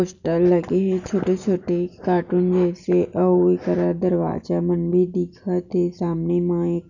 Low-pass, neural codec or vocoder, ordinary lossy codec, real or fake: 7.2 kHz; none; none; real